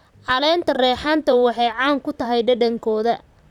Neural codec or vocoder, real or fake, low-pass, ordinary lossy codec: vocoder, 44.1 kHz, 128 mel bands, Pupu-Vocoder; fake; 19.8 kHz; none